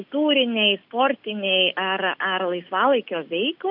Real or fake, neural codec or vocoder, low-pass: real; none; 5.4 kHz